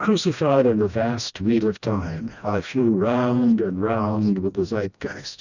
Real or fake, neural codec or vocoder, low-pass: fake; codec, 16 kHz, 1 kbps, FreqCodec, smaller model; 7.2 kHz